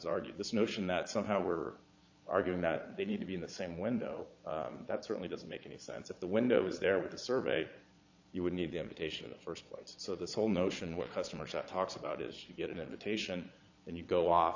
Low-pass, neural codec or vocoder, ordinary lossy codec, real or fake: 7.2 kHz; vocoder, 22.05 kHz, 80 mel bands, Vocos; AAC, 48 kbps; fake